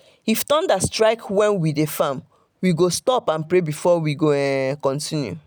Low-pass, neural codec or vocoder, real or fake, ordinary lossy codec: none; none; real; none